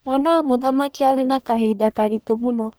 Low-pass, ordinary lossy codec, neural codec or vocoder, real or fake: none; none; codec, 44.1 kHz, 1.7 kbps, Pupu-Codec; fake